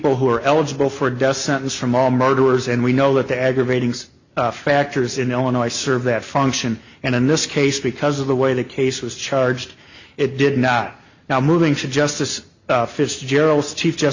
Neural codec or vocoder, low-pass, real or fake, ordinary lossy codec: none; 7.2 kHz; real; Opus, 64 kbps